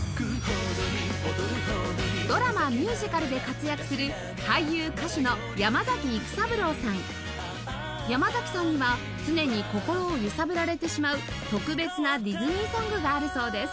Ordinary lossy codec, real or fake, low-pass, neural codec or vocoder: none; real; none; none